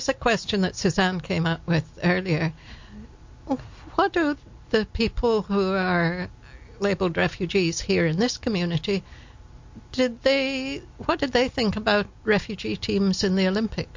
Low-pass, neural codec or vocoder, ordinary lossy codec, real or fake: 7.2 kHz; none; MP3, 48 kbps; real